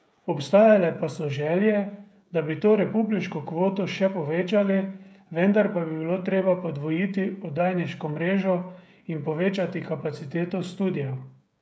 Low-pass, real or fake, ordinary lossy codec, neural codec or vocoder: none; fake; none; codec, 16 kHz, 16 kbps, FreqCodec, smaller model